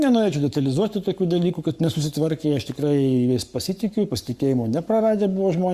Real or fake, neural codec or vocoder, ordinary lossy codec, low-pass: fake; codec, 44.1 kHz, 7.8 kbps, Pupu-Codec; Opus, 64 kbps; 14.4 kHz